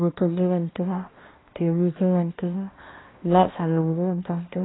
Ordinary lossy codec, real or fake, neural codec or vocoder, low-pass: AAC, 16 kbps; fake; codec, 16 kHz, 1 kbps, X-Codec, HuBERT features, trained on balanced general audio; 7.2 kHz